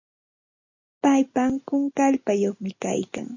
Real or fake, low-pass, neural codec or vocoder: real; 7.2 kHz; none